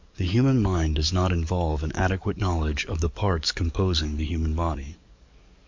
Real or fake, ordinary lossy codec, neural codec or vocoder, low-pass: fake; MP3, 64 kbps; codec, 44.1 kHz, 7.8 kbps, DAC; 7.2 kHz